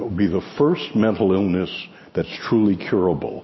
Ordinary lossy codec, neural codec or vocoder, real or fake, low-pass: MP3, 24 kbps; none; real; 7.2 kHz